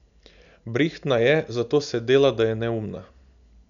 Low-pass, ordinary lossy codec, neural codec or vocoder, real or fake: 7.2 kHz; none; none; real